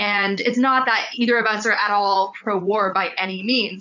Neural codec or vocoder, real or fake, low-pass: vocoder, 44.1 kHz, 80 mel bands, Vocos; fake; 7.2 kHz